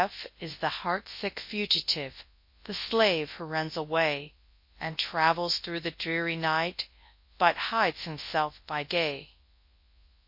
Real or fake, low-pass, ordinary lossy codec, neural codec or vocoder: fake; 5.4 kHz; MP3, 32 kbps; codec, 24 kHz, 0.9 kbps, WavTokenizer, large speech release